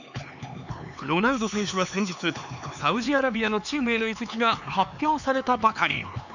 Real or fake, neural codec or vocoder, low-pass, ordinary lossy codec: fake; codec, 16 kHz, 4 kbps, X-Codec, HuBERT features, trained on LibriSpeech; 7.2 kHz; none